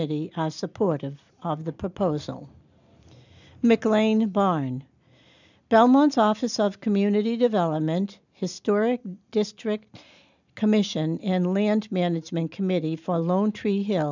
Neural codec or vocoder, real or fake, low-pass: none; real; 7.2 kHz